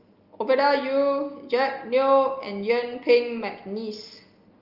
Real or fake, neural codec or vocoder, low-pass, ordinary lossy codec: real; none; 5.4 kHz; Opus, 32 kbps